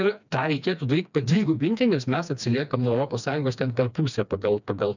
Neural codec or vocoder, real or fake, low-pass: codec, 16 kHz, 2 kbps, FreqCodec, smaller model; fake; 7.2 kHz